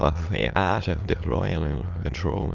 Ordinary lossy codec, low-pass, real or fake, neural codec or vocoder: Opus, 32 kbps; 7.2 kHz; fake; autoencoder, 22.05 kHz, a latent of 192 numbers a frame, VITS, trained on many speakers